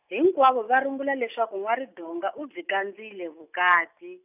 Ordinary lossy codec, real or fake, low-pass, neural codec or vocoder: none; real; 3.6 kHz; none